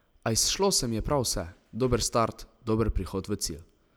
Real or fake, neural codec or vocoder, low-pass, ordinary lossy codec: real; none; none; none